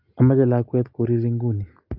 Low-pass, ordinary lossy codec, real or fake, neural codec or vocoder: 5.4 kHz; Opus, 32 kbps; real; none